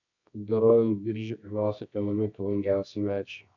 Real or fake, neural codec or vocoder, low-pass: fake; codec, 24 kHz, 0.9 kbps, WavTokenizer, medium music audio release; 7.2 kHz